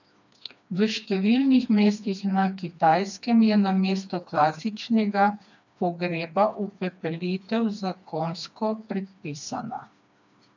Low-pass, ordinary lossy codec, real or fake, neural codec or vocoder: 7.2 kHz; none; fake; codec, 16 kHz, 2 kbps, FreqCodec, smaller model